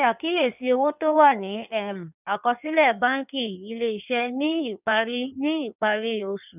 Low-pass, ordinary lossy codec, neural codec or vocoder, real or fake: 3.6 kHz; none; codec, 16 kHz in and 24 kHz out, 1.1 kbps, FireRedTTS-2 codec; fake